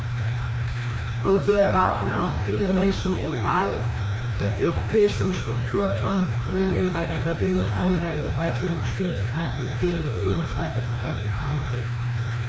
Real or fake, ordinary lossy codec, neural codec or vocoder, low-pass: fake; none; codec, 16 kHz, 1 kbps, FreqCodec, larger model; none